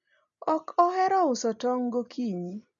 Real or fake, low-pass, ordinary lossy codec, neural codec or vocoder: real; 7.2 kHz; none; none